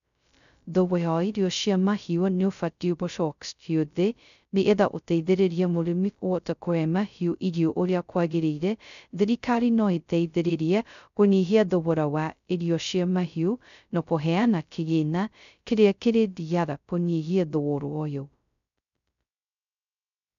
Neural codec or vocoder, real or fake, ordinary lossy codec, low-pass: codec, 16 kHz, 0.2 kbps, FocalCodec; fake; none; 7.2 kHz